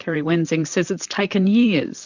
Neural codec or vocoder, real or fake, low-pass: vocoder, 44.1 kHz, 128 mel bands, Pupu-Vocoder; fake; 7.2 kHz